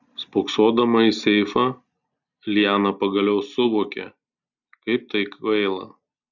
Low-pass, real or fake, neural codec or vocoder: 7.2 kHz; real; none